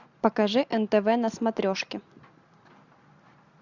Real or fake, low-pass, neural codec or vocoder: real; 7.2 kHz; none